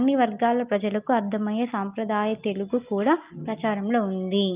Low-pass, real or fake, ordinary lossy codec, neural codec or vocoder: 3.6 kHz; real; Opus, 24 kbps; none